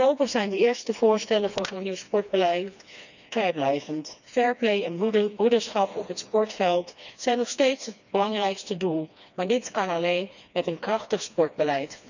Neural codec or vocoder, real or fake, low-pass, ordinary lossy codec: codec, 16 kHz, 2 kbps, FreqCodec, smaller model; fake; 7.2 kHz; none